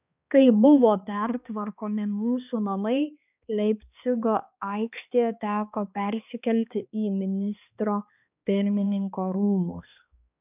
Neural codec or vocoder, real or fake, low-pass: codec, 16 kHz, 2 kbps, X-Codec, HuBERT features, trained on balanced general audio; fake; 3.6 kHz